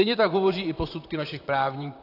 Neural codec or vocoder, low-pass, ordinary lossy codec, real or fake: vocoder, 44.1 kHz, 128 mel bands, Pupu-Vocoder; 5.4 kHz; AAC, 32 kbps; fake